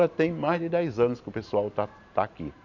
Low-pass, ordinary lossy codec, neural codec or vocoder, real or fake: 7.2 kHz; none; vocoder, 44.1 kHz, 128 mel bands every 512 samples, BigVGAN v2; fake